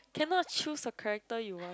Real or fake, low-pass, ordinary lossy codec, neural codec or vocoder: real; none; none; none